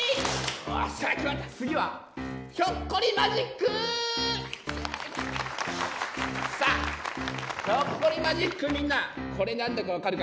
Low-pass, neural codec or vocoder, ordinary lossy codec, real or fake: none; none; none; real